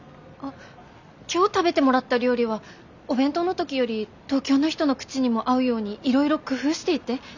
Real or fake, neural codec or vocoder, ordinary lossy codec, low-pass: real; none; none; 7.2 kHz